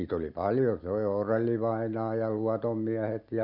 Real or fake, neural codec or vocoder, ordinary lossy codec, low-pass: real; none; none; 5.4 kHz